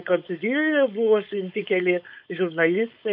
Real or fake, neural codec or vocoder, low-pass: fake; codec, 16 kHz, 4.8 kbps, FACodec; 5.4 kHz